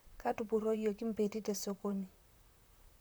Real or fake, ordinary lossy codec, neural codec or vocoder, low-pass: fake; none; vocoder, 44.1 kHz, 128 mel bands, Pupu-Vocoder; none